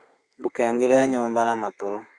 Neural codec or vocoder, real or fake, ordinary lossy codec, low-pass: codec, 32 kHz, 1.9 kbps, SNAC; fake; Opus, 64 kbps; 9.9 kHz